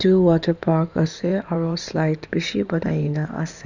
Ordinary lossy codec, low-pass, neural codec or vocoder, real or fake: none; 7.2 kHz; codec, 16 kHz in and 24 kHz out, 2.2 kbps, FireRedTTS-2 codec; fake